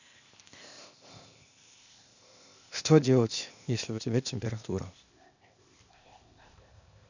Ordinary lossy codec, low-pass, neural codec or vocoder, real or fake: none; 7.2 kHz; codec, 16 kHz, 0.8 kbps, ZipCodec; fake